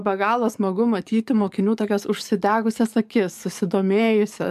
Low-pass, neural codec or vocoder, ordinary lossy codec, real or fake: 14.4 kHz; codec, 44.1 kHz, 7.8 kbps, DAC; MP3, 96 kbps; fake